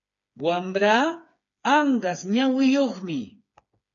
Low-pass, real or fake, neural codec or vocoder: 7.2 kHz; fake; codec, 16 kHz, 4 kbps, FreqCodec, smaller model